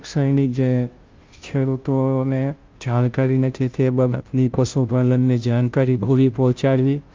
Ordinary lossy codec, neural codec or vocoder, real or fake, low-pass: Opus, 32 kbps; codec, 16 kHz, 0.5 kbps, FunCodec, trained on Chinese and English, 25 frames a second; fake; 7.2 kHz